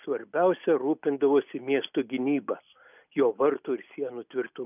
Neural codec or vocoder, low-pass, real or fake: none; 3.6 kHz; real